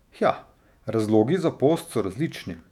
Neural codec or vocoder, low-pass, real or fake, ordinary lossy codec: autoencoder, 48 kHz, 128 numbers a frame, DAC-VAE, trained on Japanese speech; 19.8 kHz; fake; none